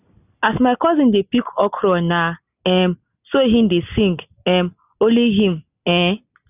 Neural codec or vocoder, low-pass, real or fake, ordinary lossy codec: none; 3.6 kHz; real; none